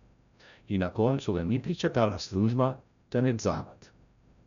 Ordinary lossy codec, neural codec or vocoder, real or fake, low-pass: none; codec, 16 kHz, 0.5 kbps, FreqCodec, larger model; fake; 7.2 kHz